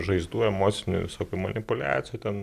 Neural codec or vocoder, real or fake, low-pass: none; real; 14.4 kHz